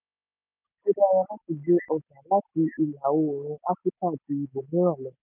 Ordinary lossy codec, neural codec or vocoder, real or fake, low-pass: none; none; real; 3.6 kHz